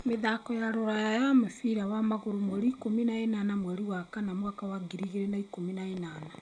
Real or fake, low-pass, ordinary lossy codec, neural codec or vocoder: real; 9.9 kHz; none; none